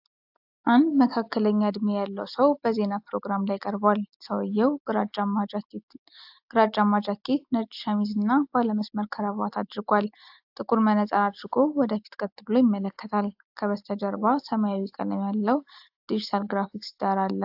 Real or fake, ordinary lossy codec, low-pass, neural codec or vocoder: real; AAC, 48 kbps; 5.4 kHz; none